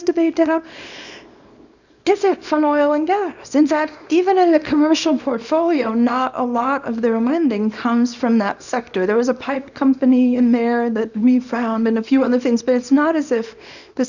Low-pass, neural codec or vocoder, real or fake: 7.2 kHz; codec, 24 kHz, 0.9 kbps, WavTokenizer, small release; fake